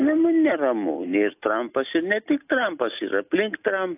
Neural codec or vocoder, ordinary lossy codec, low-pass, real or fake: none; AAC, 32 kbps; 3.6 kHz; real